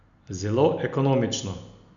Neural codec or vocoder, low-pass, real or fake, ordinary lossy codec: none; 7.2 kHz; real; none